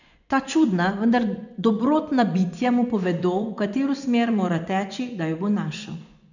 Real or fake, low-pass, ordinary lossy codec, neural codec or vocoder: fake; 7.2 kHz; none; vocoder, 24 kHz, 100 mel bands, Vocos